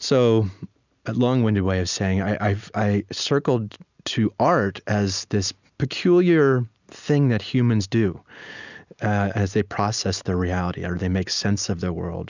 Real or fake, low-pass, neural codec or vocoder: real; 7.2 kHz; none